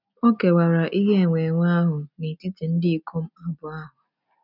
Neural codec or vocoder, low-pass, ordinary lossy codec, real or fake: none; 5.4 kHz; none; real